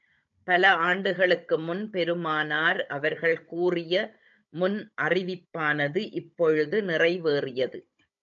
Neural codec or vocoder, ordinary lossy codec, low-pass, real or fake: codec, 16 kHz, 16 kbps, FunCodec, trained on Chinese and English, 50 frames a second; AAC, 64 kbps; 7.2 kHz; fake